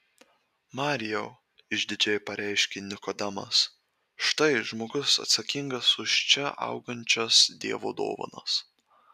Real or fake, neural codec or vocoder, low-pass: real; none; 14.4 kHz